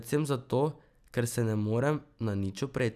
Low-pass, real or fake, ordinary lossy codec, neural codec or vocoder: 14.4 kHz; real; none; none